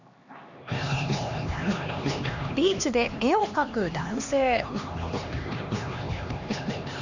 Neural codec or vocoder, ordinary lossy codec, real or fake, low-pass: codec, 16 kHz, 2 kbps, X-Codec, HuBERT features, trained on LibriSpeech; Opus, 64 kbps; fake; 7.2 kHz